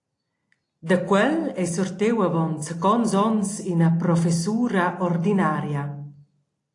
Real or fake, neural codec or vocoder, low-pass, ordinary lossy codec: real; none; 10.8 kHz; AAC, 48 kbps